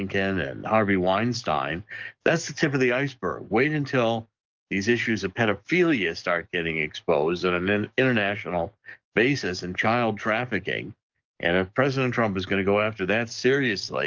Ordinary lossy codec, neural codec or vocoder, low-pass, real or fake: Opus, 24 kbps; codec, 44.1 kHz, 7.8 kbps, DAC; 7.2 kHz; fake